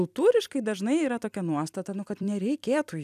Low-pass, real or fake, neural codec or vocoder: 14.4 kHz; fake; vocoder, 44.1 kHz, 128 mel bands every 256 samples, BigVGAN v2